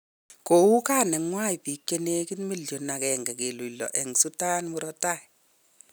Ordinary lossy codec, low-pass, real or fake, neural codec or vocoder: none; none; real; none